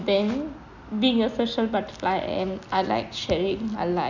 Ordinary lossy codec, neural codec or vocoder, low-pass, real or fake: none; none; 7.2 kHz; real